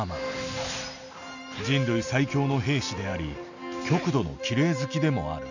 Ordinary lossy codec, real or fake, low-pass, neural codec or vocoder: none; real; 7.2 kHz; none